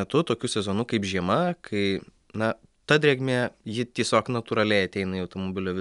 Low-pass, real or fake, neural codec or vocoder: 10.8 kHz; real; none